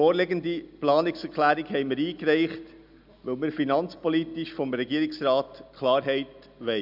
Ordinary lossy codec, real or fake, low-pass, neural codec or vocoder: none; real; 5.4 kHz; none